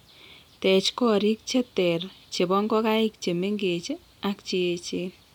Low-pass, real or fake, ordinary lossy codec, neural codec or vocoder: 19.8 kHz; real; none; none